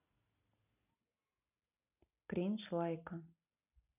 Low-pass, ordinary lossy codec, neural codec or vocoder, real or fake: 3.6 kHz; none; none; real